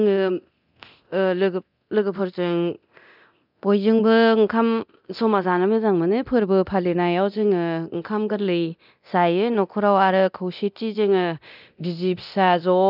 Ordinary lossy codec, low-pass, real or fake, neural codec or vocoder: none; 5.4 kHz; fake; codec, 24 kHz, 0.9 kbps, DualCodec